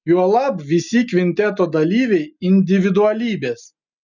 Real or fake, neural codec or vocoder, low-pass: real; none; 7.2 kHz